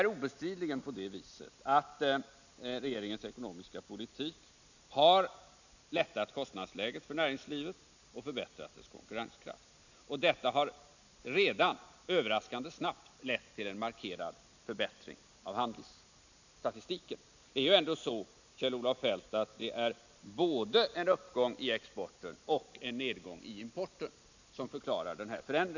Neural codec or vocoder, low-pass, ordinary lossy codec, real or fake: none; 7.2 kHz; none; real